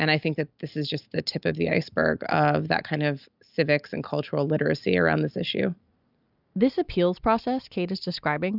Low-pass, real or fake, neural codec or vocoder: 5.4 kHz; real; none